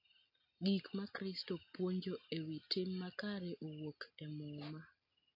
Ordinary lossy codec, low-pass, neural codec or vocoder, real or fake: MP3, 32 kbps; 5.4 kHz; none; real